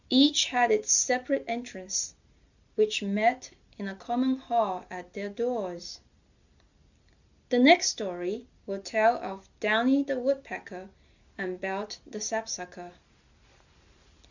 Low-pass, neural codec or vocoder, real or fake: 7.2 kHz; none; real